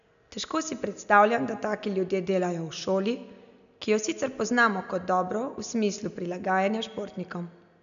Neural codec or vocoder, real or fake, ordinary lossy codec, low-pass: none; real; none; 7.2 kHz